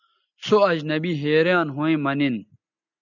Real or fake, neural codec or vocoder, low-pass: real; none; 7.2 kHz